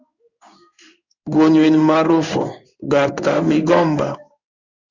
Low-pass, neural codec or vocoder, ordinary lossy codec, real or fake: 7.2 kHz; codec, 16 kHz in and 24 kHz out, 1 kbps, XY-Tokenizer; Opus, 64 kbps; fake